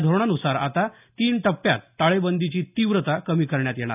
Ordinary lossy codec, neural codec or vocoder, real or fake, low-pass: none; none; real; 3.6 kHz